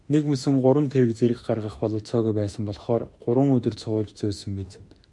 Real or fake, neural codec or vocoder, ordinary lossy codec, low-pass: fake; autoencoder, 48 kHz, 32 numbers a frame, DAC-VAE, trained on Japanese speech; MP3, 64 kbps; 10.8 kHz